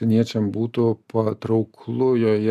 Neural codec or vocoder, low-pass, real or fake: none; 14.4 kHz; real